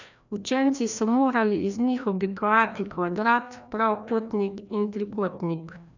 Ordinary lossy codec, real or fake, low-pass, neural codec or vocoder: none; fake; 7.2 kHz; codec, 16 kHz, 1 kbps, FreqCodec, larger model